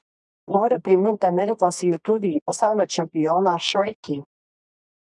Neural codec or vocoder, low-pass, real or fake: codec, 24 kHz, 0.9 kbps, WavTokenizer, medium music audio release; 10.8 kHz; fake